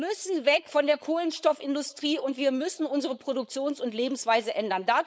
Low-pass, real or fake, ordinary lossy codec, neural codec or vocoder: none; fake; none; codec, 16 kHz, 4.8 kbps, FACodec